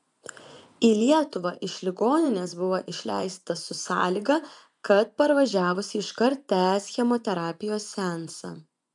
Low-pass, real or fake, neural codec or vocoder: 10.8 kHz; fake; vocoder, 24 kHz, 100 mel bands, Vocos